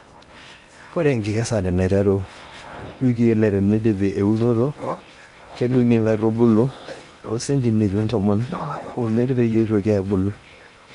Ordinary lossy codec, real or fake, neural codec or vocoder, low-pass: MP3, 64 kbps; fake; codec, 16 kHz in and 24 kHz out, 0.8 kbps, FocalCodec, streaming, 65536 codes; 10.8 kHz